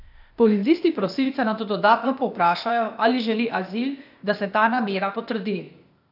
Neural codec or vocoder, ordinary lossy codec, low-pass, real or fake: codec, 16 kHz, 0.8 kbps, ZipCodec; none; 5.4 kHz; fake